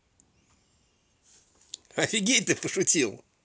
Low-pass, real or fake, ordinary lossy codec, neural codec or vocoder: none; real; none; none